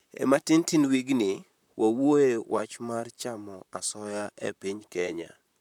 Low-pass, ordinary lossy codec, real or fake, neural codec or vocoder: 19.8 kHz; none; fake; vocoder, 44.1 kHz, 128 mel bands, Pupu-Vocoder